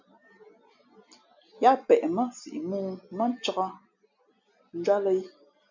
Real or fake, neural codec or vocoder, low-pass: real; none; 7.2 kHz